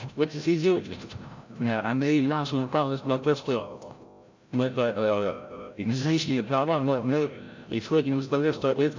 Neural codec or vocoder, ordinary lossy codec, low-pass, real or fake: codec, 16 kHz, 0.5 kbps, FreqCodec, larger model; MP3, 48 kbps; 7.2 kHz; fake